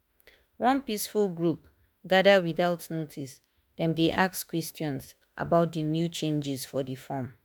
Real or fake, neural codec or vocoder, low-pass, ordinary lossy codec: fake; autoencoder, 48 kHz, 32 numbers a frame, DAC-VAE, trained on Japanese speech; none; none